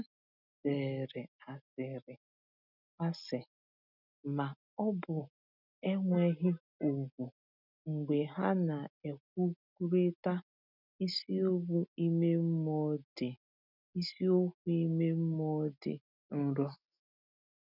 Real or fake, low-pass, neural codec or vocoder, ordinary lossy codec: real; 5.4 kHz; none; none